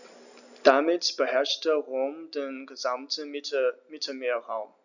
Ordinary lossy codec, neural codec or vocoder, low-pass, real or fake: none; none; 7.2 kHz; real